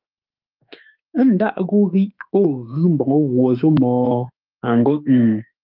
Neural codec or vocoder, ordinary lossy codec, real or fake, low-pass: autoencoder, 48 kHz, 32 numbers a frame, DAC-VAE, trained on Japanese speech; Opus, 24 kbps; fake; 5.4 kHz